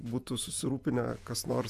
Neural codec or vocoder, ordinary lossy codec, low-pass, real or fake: vocoder, 48 kHz, 128 mel bands, Vocos; AAC, 96 kbps; 14.4 kHz; fake